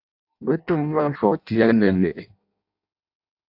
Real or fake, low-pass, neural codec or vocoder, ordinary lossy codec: fake; 5.4 kHz; codec, 16 kHz in and 24 kHz out, 0.6 kbps, FireRedTTS-2 codec; AAC, 48 kbps